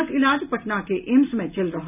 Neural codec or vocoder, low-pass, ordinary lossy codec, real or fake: none; 3.6 kHz; none; real